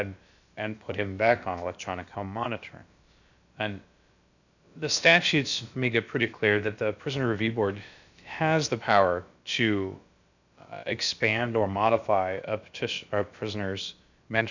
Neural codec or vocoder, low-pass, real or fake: codec, 16 kHz, about 1 kbps, DyCAST, with the encoder's durations; 7.2 kHz; fake